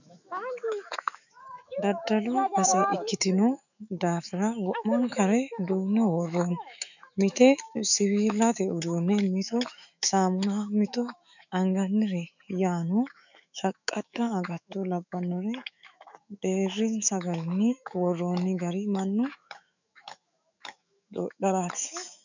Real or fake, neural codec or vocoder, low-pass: fake; autoencoder, 48 kHz, 128 numbers a frame, DAC-VAE, trained on Japanese speech; 7.2 kHz